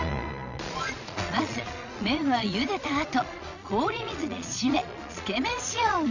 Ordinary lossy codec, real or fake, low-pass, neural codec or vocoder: none; fake; 7.2 kHz; vocoder, 22.05 kHz, 80 mel bands, Vocos